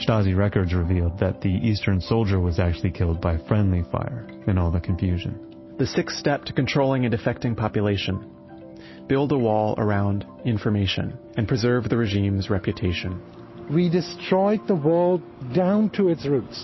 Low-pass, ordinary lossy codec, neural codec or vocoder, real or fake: 7.2 kHz; MP3, 24 kbps; none; real